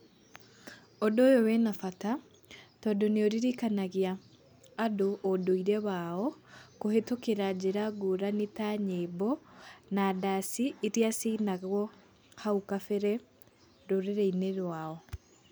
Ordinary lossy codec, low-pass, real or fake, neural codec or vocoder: none; none; real; none